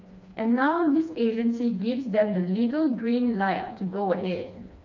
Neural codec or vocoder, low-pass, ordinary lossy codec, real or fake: codec, 16 kHz, 2 kbps, FreqCodec, smaller model; 7.2 kHz; MP3, 64 kbps; fake